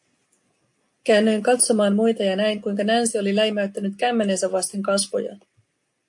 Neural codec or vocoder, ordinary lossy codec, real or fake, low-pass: none; AAC, 64 kbps; real; 10.8 kHz